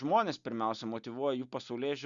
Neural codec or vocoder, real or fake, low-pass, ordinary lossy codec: none; real; 7.2 kHz; Opus, 64 kbps